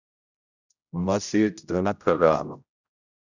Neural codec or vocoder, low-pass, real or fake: codec, 16 kHz, 0.5 kbps, X-Codec, HuBERT features, trained on general audio; 7.2 kHz; fake